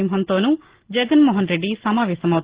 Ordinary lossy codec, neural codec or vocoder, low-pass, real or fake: Opus, 16 kbps; none; 3.6 kHz; real